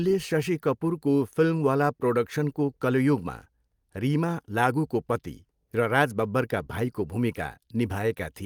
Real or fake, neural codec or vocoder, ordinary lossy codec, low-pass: real; none; Opus, 32 kbps; 19.8 kHz